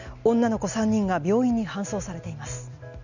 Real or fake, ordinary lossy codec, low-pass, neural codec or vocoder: real; none; 7.2 kHz; none